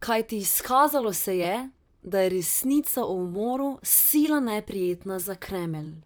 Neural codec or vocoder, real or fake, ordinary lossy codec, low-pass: vocoder, 44.1 kHz, 128 mel bands, Pupu-Vocoder; fake; none; none